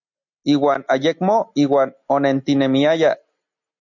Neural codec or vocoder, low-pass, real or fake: none; 7.2 kHz; real